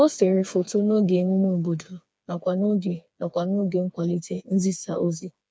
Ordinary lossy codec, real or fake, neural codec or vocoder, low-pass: none; fake; codec, 16 kHz, 4 kbps, FreqCodec, smaller model; none